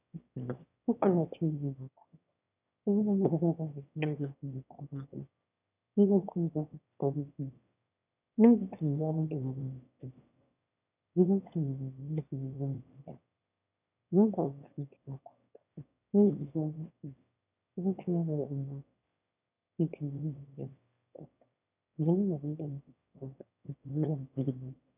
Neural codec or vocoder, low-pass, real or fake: autoencoder, 22.05 kHz, a latent of 192 numbers a frame, VITS, trained on one speaker; 3.6 kHz; fake